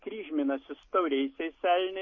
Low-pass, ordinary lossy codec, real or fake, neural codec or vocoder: 7.2 kHz; MP3, 32 kbps; real; none